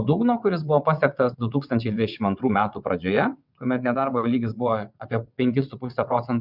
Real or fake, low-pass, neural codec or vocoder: fake; 5.4 kHz; vocoder, 22.05 kHz, 80 mel bands, WaveNeXt